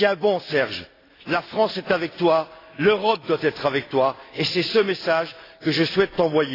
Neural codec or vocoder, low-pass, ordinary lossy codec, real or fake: none; 5.4 kHz; AAC, 24 kbps; real